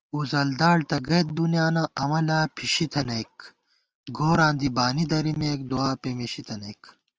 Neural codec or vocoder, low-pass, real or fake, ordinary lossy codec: none; 7.2 kHz; real; Opus, 24 kbps